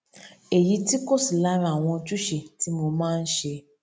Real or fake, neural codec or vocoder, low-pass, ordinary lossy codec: real; none; none; none